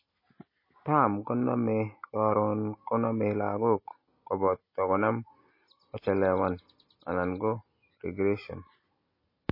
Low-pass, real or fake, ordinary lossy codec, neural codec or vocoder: 5.4 kHz; real; MP3, 24 kbps; none